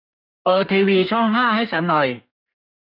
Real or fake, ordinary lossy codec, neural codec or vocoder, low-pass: fake; none; codec, 32 kHz, 1.9 kbps, SNAC; 5.4 kHz